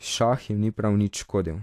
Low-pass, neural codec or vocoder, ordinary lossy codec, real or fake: 14.4 kHz; none; AAC, 64 kbps; real